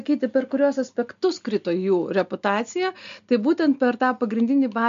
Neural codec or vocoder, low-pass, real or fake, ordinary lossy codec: none; 7.2 kHz; real; MP3, 64 kbps